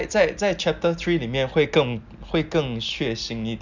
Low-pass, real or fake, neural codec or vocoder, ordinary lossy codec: 7.2 kHz; real; none; none